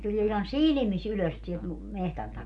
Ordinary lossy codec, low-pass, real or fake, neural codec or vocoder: none; 10.8 kHz; real; none